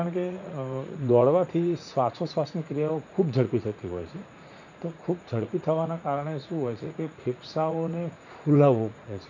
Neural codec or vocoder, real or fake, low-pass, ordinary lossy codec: vocoder, 44.1 kHz, 80 mel bands, Vocos; fake; 7.2 kHz; none